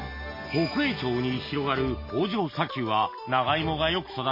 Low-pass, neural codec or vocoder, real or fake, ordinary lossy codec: 5.4 kHz; none; real; MP3, 24 kbps